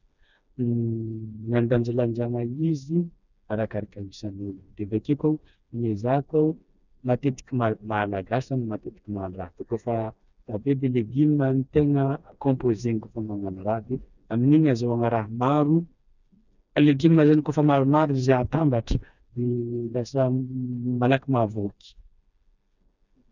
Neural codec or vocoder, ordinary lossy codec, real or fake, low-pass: codec, 16 kHz, 2 kbps, FreqCodec, smaller model; none; fake; 7.2 kHz